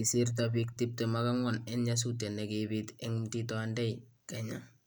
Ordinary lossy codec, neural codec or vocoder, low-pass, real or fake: none; none; none; real